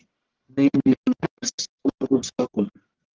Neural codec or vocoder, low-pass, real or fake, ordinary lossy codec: codec, 44.1 kHz, 1.7 kbps, Pupu-Codec; 7.2 kHz; fake; Opus, 16 kbps